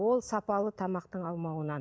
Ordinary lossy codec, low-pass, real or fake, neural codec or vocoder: none; none; real; none